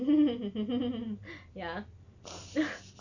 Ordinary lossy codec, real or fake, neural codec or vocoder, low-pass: none; real; none; 7.2 kHz